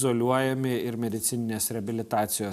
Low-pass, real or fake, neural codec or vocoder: 14.4 kHz; real; none